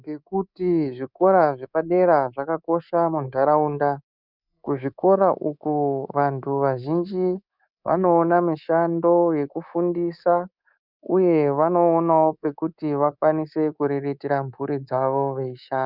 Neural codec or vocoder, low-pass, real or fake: codec, 24 kHz, 3.1 kbps, DualCodec; 5.4 kHz; fake